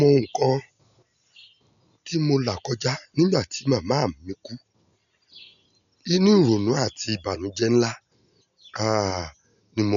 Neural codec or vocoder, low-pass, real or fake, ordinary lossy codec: none; 7.2 kHz; real; none